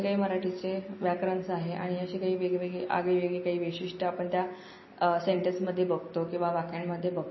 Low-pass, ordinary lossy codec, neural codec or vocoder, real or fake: 7.2 kHz; MP3, 24 kbps; none; real